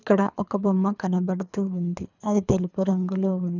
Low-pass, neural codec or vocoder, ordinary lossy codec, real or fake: 7.2 kHz; codec, 24 kHz, 3 kbps, HILCodec; none; fake